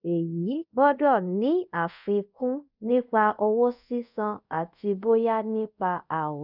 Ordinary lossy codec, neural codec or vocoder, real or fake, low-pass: none; codec, 24 kHz, 0.5 kbps, DualCodec; fake; 5.4 kHz